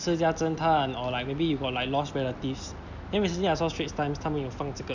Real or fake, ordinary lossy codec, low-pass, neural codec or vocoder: real; none; 7.2 kHz; none